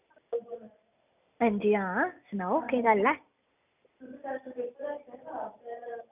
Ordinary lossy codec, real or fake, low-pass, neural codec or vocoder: none; real; 3.6 kHz; none